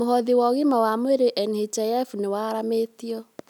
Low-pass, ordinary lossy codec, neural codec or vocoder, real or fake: 19.8 kHz; none; none; real